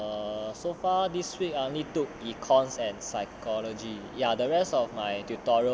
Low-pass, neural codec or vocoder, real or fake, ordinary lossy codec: none; none; real; none